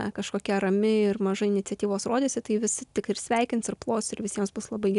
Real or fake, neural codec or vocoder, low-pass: real; none; 10.8 kHz